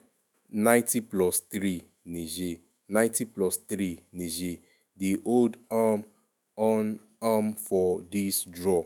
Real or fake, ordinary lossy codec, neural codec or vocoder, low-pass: fake; none; autoencoder, 48 kHz, 128 numbers a frame, DAC-VAE, trained on Japanese speech; none